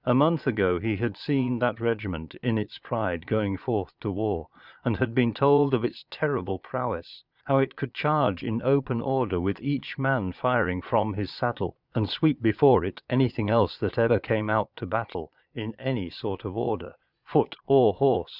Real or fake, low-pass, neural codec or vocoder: fake; 5.4 kHz; vocoder, 22.05 kHz, 80 mel bands, Vocos